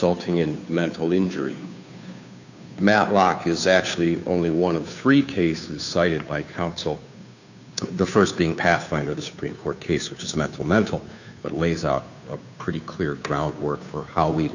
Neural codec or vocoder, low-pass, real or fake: codec, 16 kHz, 2 kbps, FunCodec, trained on Chinese and English, 25 frames a second; 7.2 kHz; fake